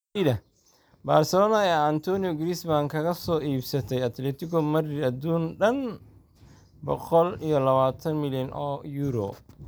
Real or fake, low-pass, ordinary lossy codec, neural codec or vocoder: real; none; none; none